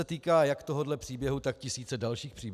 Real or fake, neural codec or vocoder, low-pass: real; none; 14.4 kHz